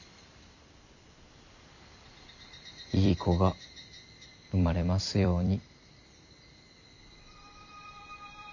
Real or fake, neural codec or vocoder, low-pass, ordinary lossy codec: fake; vocoder, 44.1 kHz, 128 mel bands every 256 samples, BigVGAN v2; 7.2 kHz; none